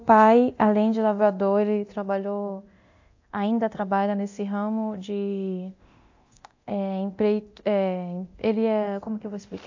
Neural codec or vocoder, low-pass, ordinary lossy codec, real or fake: codec, 24 kHz, 0.9 kbps, DualCodec; 7.2 kHz; AAC, 48 kbps; fake